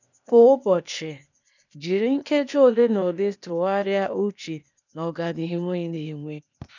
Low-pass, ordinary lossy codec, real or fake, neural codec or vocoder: 7.2 kHz; none; fake; codec, 16 kHz, 0.8 kbps, ZipCodec